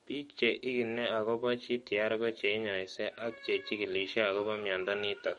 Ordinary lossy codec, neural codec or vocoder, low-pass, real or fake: MP3, 48 kbps; codec, 44.1 kHz, 7.8 kbps, DAC; 19.8 kHz; fake